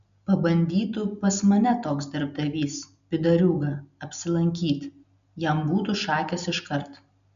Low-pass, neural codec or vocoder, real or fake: 7.2 kHz; none; real